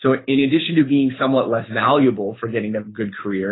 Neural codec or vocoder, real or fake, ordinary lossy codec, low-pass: codec, 24 kHz, 6 kbps, HILCodec; fake; AAC, 16 kbps; 7.2 kHz